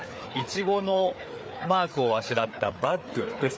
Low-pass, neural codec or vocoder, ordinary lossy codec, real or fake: none; codec, 16 kHz, 4 kbps, FreqCodec, larger model; none; fake